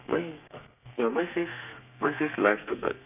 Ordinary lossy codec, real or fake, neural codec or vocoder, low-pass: none; fake; codec, 32 kHz, 1.9 kbps, SNAC; 3.6 kHz